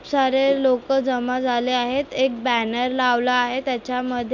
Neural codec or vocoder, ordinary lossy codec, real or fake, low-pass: none; none; real; 7.2 kHz